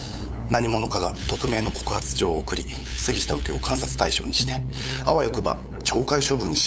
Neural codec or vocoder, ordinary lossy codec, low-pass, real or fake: codec, 16 kHz, 8 kbps, FunCodec, trained on LibriTTS, 25 frames a second; none; none; fake